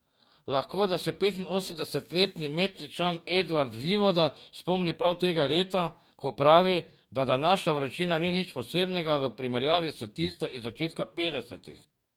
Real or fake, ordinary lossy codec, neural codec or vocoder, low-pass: fake; MP3, 96 kbps; codec, 44.1 kHz, 2.6 kbps, DAC; 19.8 kHz